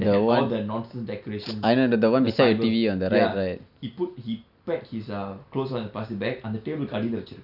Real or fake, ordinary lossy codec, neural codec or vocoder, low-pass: real; none; none; 5.4 kHz